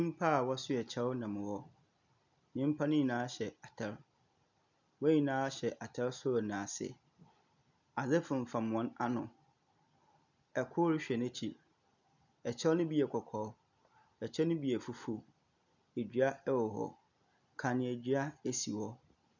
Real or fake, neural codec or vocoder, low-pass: real; none; 7.2 kHz